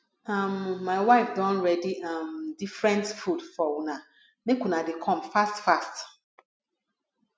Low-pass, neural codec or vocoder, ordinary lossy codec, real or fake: none; none; none; real